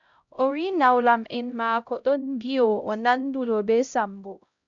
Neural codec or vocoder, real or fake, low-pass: codec, 16 kHz, 0.5 kbps, X-Codec, HuBERT features, trained on LibriSpeech; fake; 7.2 kHz